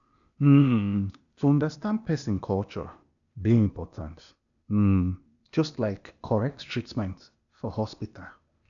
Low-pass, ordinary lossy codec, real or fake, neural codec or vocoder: 7.2 kHz; none; fake; codec, 16 kHz, 0.8 kbps, ZipCodec